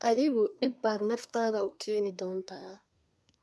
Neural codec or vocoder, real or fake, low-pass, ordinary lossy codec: codec, 24 kHz, 1 kbps, SNAC; fake; none; none